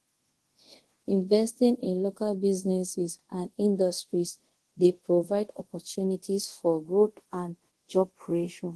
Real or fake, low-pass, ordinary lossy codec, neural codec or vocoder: fake; 10.8 kHz; Opus, 16 kbps; codec, 24 kHz, 0.5 kbps, DualCodec